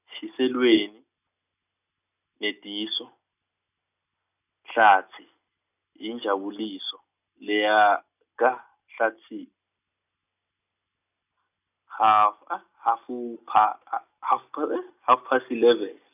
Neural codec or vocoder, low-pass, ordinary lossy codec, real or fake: none; 3.6 kHz; none; real